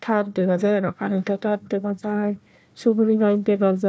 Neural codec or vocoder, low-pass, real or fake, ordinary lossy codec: codec, 16 kHz, 1 kbps, FunCodec, trained on Chinese and English, 50 frames a second; none; fake; none